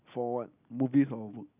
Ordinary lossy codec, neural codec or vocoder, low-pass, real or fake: none; none; 3.6 kHz; real